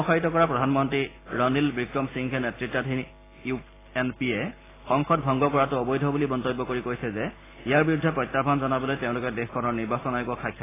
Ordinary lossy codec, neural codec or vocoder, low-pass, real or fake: AAC, 16 kbps; none; 3.6 kHz; real